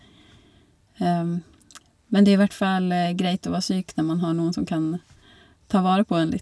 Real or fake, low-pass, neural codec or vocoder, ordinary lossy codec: real; none; none; none